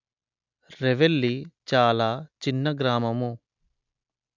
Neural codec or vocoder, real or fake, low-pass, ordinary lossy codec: none; real; 7.2 kHz; none